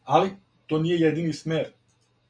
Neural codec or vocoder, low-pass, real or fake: none; 9.9 kHz; real